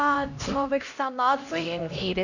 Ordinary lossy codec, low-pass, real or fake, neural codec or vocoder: none; 7.2 kHz; fake; codec, 16 kHz, 1 kbps, X-Codec, HuBERT features, trained on LibriSpeech